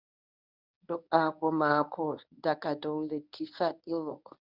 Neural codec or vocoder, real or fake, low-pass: codec, 24 kHz, 0.9 kbps, WavTokenizer, medium speech release version 1; fake; 5.4 kHz